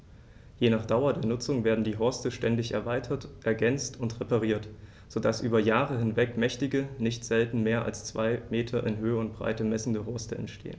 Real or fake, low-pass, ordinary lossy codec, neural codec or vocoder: real; none; none; none